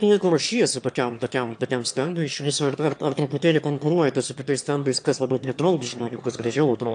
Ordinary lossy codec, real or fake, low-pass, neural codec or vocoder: AAC, 64 kbps; fake; 9.9 kHz; autoencoder, 22.05 kHz, a latent of 192 numbers a frame, VITS, trained on one speaker